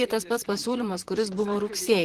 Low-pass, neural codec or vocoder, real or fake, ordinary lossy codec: 14.4 kHz; vocoder, 44.1 kHz, 128 mel bands, Pupu-Vocoder; fake; Opus, 16 kbps